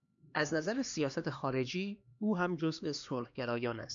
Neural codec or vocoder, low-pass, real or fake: codec, 16 kHz, 2 kbps, X-Codec, HuBERT features, trained on LibriSpeech; 7.2 kHz; fake